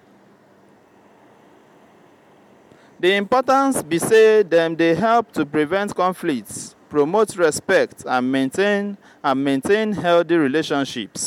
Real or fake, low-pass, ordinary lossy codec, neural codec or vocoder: real; 19.8 kHz; MP3, 96 kbps; none